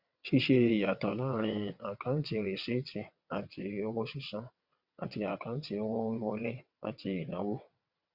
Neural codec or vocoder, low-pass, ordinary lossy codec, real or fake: vocoder, 22.05 kHz, 80 mel bands, WaveNeXt; 5.4 kHz; Opus, 64 kbps; fake